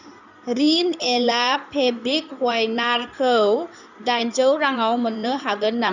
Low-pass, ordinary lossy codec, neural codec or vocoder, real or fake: 7.2 kHz; none; codec, 16 kHz in and 24 kHz out, 2.2 kbps, FireRedTTS-2 codec; fake